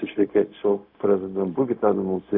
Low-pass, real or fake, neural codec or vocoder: 5.4 kHz; fake; codec, 16 kHz, 0.4 kbps, LongCat-Audio-Codec